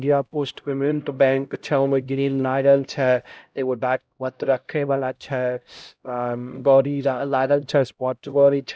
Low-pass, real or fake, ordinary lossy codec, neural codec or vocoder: none; fake; none; codec, 16 kHz, 0.5 kbps, X-Codec, HuBERT features, trained on LibriSpeech